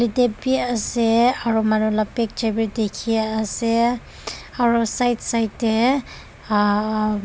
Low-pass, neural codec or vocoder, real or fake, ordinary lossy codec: none; none; real; none